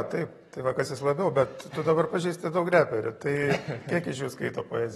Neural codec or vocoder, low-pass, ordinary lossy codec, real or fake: vocoder, 44.1 kHz, 128 mel bands every 512 samples, BigVGAN v2; 19.8 kHz; AAC, 32 kbps; fake